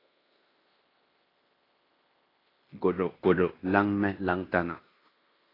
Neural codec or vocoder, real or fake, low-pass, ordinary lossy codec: codec, 16 kHz in and 24 kHz out, 0.9 kbps, LongCat-Audio-Codec, fine tuned four codebook decoder; fake; 5.4 kHz; AAC, 24 kbps